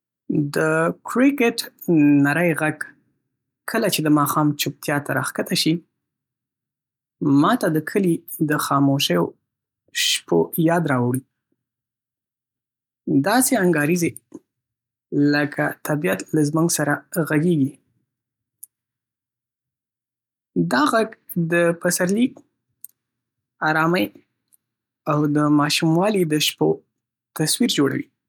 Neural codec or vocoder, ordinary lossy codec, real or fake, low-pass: none; none; real; 19.8 kHz